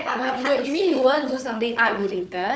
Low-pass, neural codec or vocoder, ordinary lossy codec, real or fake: none; codec, 16 kHz, 4 kbps, FunCodec, trained on Chinese and English, 50 frames a second; none; fake